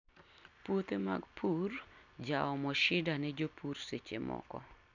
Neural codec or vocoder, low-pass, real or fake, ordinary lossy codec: none; 7.2 kHz; real; none